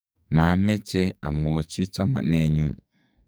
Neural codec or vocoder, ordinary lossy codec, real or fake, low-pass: codec, 44.1 kHz, 2.6 kbps, SNAC; none; fake; none